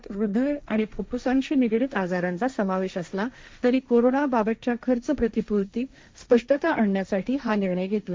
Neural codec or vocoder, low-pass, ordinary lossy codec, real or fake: codec, 16 kHz, 1.1 kbps, Voila-Tokenizer; none; none; fake